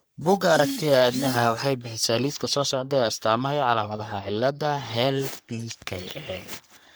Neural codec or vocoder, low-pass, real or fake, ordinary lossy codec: codec, 44.1 kHz, 3.4 kbps, Pupu-Codec; none; fake; none